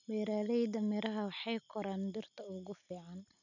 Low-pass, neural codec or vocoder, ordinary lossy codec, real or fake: 7.2 kHz; none; none; real